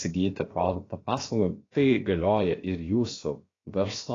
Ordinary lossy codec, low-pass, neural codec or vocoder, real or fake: AAC, 32 kbps; 7.2 kHz; codec, 16 kHz, about 1 kbps, DyCAST, with the encoder's durations; fake